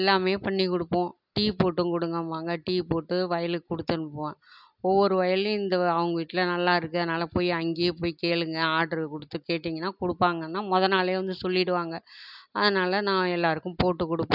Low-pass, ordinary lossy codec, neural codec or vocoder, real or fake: 5.4 kHz; none; none; real